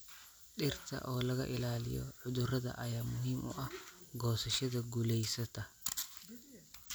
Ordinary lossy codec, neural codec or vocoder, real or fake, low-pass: none; none; real; none